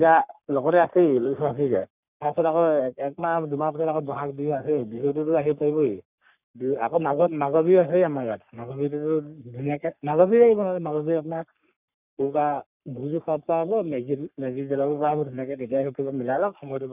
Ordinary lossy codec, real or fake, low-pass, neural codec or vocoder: none; fake; 3.6 kHz; codec, 44.1 kHz, 3.4 kbps, Pupu-Codec